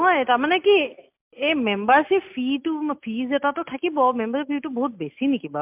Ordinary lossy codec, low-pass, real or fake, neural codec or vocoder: MP3, 32 kbps; 3.6 kHz; real; none